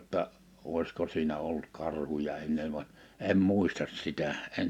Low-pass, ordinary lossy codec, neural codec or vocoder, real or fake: 19.8 kHz; none; none; real